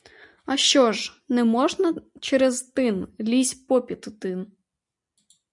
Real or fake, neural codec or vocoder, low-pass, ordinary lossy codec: real; none; 10.8 kHz; MP3, 96 kbps